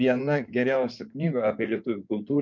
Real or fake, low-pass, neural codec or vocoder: fake; 7.2 kHz; codec, 16 kHz in and 24 kHz out, 2.2 kbps, FireRedTTS-2 codec